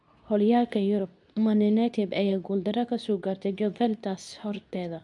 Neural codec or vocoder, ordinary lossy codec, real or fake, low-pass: codec, 24 kHz, 0.9 kbps, WavTokenizer, medium speech release version 2; none; fake; 10.8 kHz